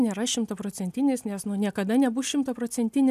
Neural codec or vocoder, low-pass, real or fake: none; 14.4 kHz; real